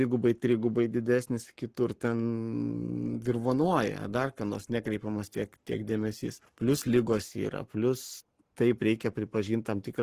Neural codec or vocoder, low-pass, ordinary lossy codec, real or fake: codec, 44.1 kHz, 7.8 kbps, Pupu-Codec; 14.4 kHz; Opus, 16 kbps; fake